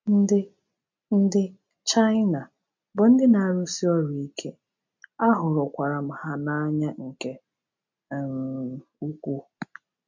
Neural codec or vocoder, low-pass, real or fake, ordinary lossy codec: none; 7.2 kHz; real; MP3, 64 kbps